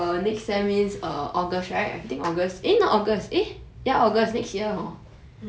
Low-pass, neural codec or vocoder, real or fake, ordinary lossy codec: none; none; real; none